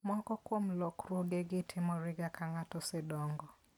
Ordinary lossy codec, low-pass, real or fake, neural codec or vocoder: none; 19.8 kHz; real; none